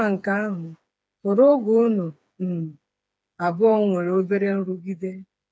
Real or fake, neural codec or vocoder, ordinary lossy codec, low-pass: fake; codec, 16 kHz, 4 kbps, FreqCodec, smaller model; none; none